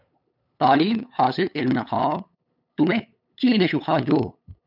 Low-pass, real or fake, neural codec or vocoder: 5.4 kHz; fake; codec, 16 kHz, 8 kbps, FunCodec, trained on LibriTTS, 25 frames a second